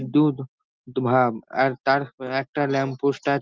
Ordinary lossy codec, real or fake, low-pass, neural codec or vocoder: Opus, 24 kbps; real; 7.2 kHz; none